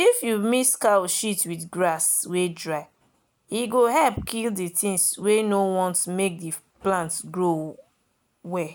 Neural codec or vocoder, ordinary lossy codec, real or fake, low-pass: none; none; real; none